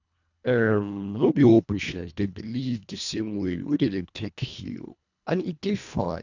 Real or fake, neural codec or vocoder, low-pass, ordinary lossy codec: fake; codec, 24 kHz, 1.5 kbps, HILCodec; 7.2 kHz; none